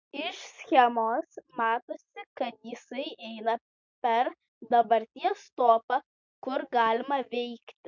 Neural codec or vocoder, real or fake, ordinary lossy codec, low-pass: none; real; MP3, 64 kbps; 7.2 kHz